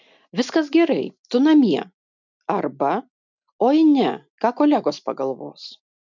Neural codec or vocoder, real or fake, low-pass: none; real; 7.2 kHz